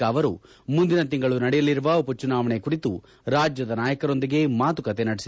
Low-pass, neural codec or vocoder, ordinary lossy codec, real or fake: none; none; none; real